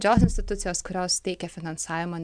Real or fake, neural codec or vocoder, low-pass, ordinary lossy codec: real; none; 9.9 kHz; MP3, 96 kbps